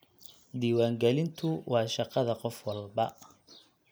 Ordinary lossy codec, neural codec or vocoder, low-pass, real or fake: none; none; none; real